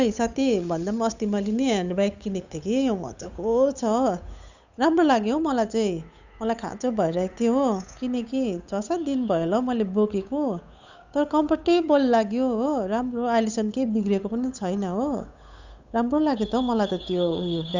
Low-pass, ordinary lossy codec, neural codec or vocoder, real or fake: 7.2 kHz; none; codec, 16 kHz, 8 kbps, FunCodec, trained on Chinese and English, 25 frames a second; fake